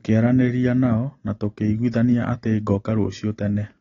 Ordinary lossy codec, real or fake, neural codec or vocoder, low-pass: AAC, 32 kbps; real; none; 7.2 kHz